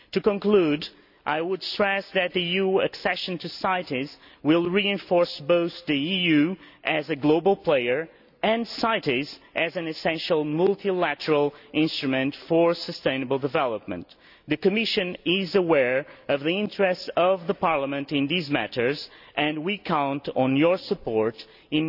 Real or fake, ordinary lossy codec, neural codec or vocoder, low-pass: real; none; none; 5.4 kHz